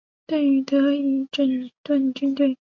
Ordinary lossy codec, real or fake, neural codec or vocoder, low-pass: MP3, 48 kbps; real; none; 7.2 kHz